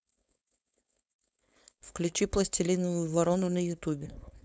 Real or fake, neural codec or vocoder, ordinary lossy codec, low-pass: fake; codec, 16 kHz, 4.8 kbps, FACodec; none; none